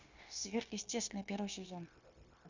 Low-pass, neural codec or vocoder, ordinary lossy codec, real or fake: 7.2 kHz; codec, 16 kHz, 0.9 kbps, LongCat-Audio-Codec; Opus, 64 kbps; fake